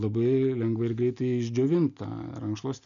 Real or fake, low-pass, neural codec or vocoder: real; 7.2 kHz; none